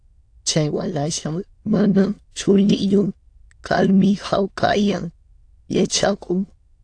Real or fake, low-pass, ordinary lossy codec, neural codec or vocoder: fake; 9.9 kHz; AAC, 48 kbps; autoencoder, 22.05 kHz, a latent of 192 numbers a frame, VITS, trained on many speakers